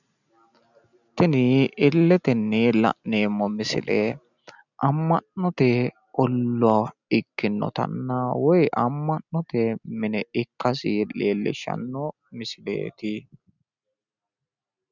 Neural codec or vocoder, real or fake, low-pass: none; real; 7.2 kHz